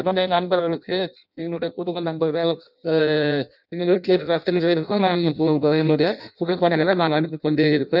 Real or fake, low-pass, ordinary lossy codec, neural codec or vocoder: fake; 5.4 kHz; none; codec, 16 kHz in and 24 kHz out, 0.6 kbps, FireRedTTS-2 codec